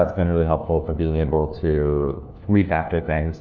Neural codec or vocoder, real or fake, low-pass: codec, 16 kHz, 1 kbps, FunCodec, trained on LibriTTS, 50 frames a second; fake; 7.2 kHz